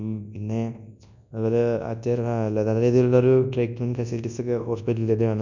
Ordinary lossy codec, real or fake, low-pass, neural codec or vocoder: none; fake; 7.2 kHz; codec, 24 kHz, 0.9 kbps, WavTokenizer, large speech release